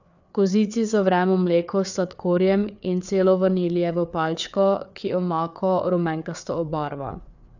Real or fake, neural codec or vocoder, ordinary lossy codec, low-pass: fake; codec, 16 kHz, 4 kbps, FreqCodec, larger model; none; 7.2 kHz